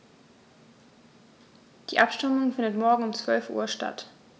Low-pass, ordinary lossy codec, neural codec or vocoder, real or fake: none; none; none; real